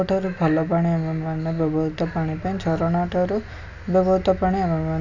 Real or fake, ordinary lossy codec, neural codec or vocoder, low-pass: real; none; none; 7.2 kHz